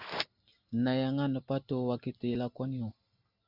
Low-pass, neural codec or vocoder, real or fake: 5.4 kHz; none; real